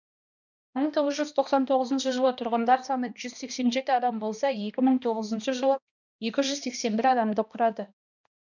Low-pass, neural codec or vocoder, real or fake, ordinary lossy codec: 7.2 kHz; codec, 16 kHz, 1 kbps, X-Codec, HuBERT features, trained on balanced general audio; fake; none